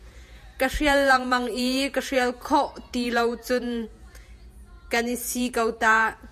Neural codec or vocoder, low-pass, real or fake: vocoder, 48 kHz, 128 mel bands, Vocos; 14.4 kHz; fake